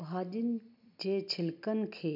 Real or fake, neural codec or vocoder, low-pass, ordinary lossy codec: real; none; 5.4 kHz; MP3, 48 kbps